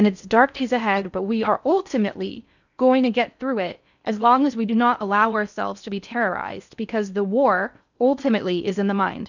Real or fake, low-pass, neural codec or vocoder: fake; 7.2 kHz; codec, 16 kHz in and 24 kHz out, 0.6 kbps, FocalCodec, streaming, 4096 codes